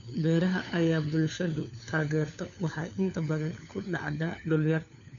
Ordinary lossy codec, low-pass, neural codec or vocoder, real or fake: none; 7.2 kHz; codec, 16 kHz, 4 kbps, FunCodec, trained on LibriTTS, 50 frames a second; fake